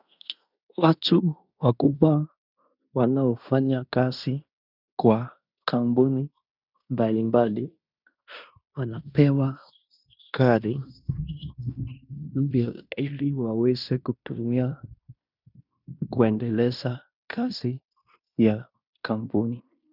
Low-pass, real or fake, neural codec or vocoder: 5.4 kHz; fake; codec, 16 kHz in and 24 kHz out, 0.9 kbps, LongCat-Audio-Codec, fine tuned four codebook decoder